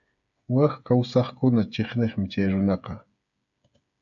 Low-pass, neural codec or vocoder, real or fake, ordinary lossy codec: 7.2 kHz; codec, 16 kHz, 8 kbps, FreqCodec, smaller model; fake; AAC, 64 kbps